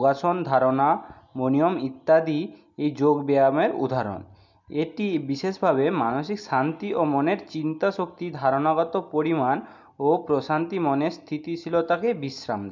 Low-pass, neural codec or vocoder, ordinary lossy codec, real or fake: 7.2 kHz; none; MP3, 64 kbps; real